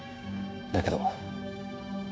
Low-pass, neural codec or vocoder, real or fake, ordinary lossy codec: none; codec, 16 kHz, 6 kbps, DAC; fake; none